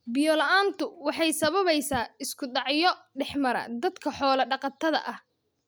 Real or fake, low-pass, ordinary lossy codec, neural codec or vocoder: real; none; none; none